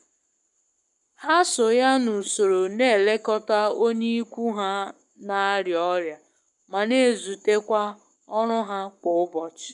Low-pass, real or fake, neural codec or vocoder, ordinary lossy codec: 10.8 kHz; fake; codec, 44.1 kHz, 7.8 kbps, Pupu-Codec; none